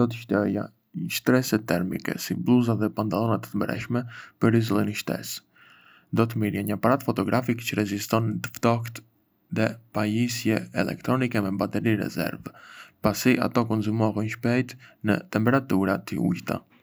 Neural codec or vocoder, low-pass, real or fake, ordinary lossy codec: none; none; real; none